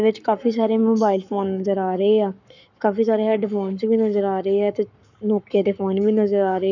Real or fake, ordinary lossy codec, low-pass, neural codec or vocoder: fake; none; 7.2 kHz; codec, 44.1 kHz, 7.8 kbps, Pupu-Codec